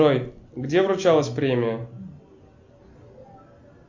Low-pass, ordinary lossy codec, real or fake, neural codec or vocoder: 7.2 kHz; MP3, 64 kbps; real; none